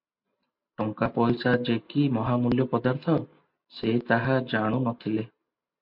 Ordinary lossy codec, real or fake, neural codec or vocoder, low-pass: MP3, 48 kbps; real; none; 5.4 kHz